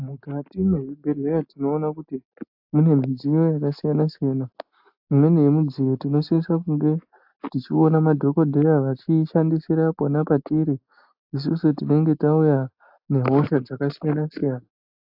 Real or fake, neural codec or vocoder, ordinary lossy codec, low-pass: real; none; AAC, 48 kbps; 5.4 kHz